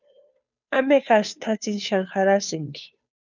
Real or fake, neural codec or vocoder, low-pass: fake; codec, 16 kHz, 2 kbps, FunCodec, trained on Chinese and English, 25 frames a second; 7.2 kHz